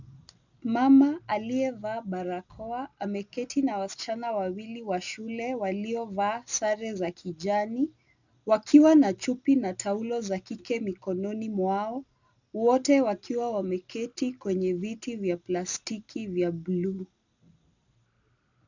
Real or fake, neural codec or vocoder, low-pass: real; none; 7.2 kHz